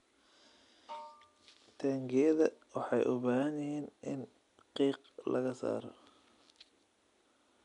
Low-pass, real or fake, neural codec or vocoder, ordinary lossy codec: 10.8 kHz; real; none; none